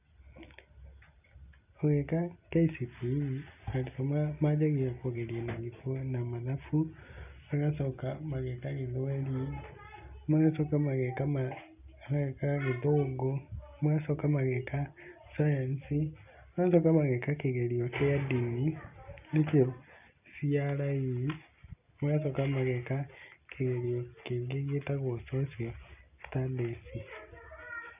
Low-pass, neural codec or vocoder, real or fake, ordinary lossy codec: 3.6 kHz; none; real; none